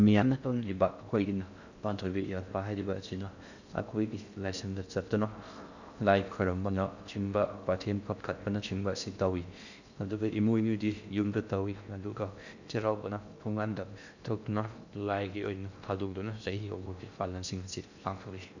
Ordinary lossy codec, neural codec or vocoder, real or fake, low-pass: none; codec, 16 kHz in and 24 kHz out, 0.6 kbps, FocalCodec, streaming, 4096 codes; fake; 7.2 kHz